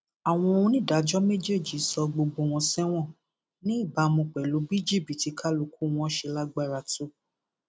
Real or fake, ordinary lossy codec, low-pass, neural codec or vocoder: real; none; none; none